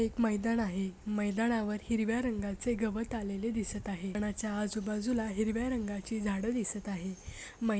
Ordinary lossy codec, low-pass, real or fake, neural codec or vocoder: none; none; real; none